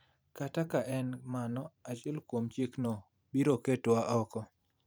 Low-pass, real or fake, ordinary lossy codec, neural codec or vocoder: none; fake; none; vocoder, 44.1 kHz, 128 mel bands every 512 samples, BigVGAN v2